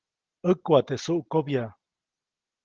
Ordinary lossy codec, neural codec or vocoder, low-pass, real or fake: Opus, 16 kbps; none; 7.2 kHz; real